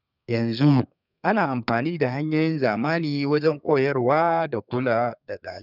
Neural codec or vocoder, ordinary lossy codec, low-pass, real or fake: codec, 32 kHz, 1.9 kbps, SNAC; none; 5.4 kHz; fake